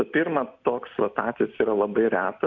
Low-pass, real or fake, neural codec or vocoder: 7.2 kHz; real; none